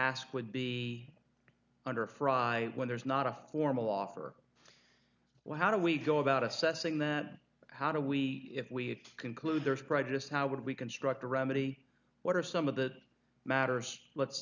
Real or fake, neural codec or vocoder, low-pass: real; none; 7.2 kHz